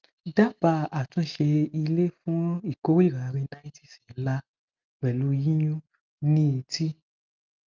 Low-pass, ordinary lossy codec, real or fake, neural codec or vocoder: 7.2 kHz; Opus, 24 kbps; real; none